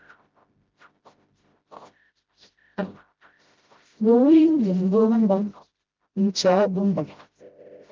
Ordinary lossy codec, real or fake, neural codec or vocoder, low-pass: Opus, 32 kbps; fake; codec, 16 kHz, 0.5 kbps, FreqCodec, smaller model; 7.2 kHz